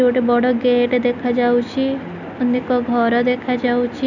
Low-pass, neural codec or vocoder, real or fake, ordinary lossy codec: 7.2 kHz; none; real; none